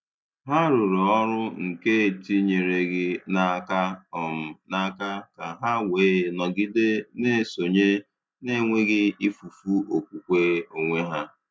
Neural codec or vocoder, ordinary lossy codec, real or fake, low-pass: none; none; real; none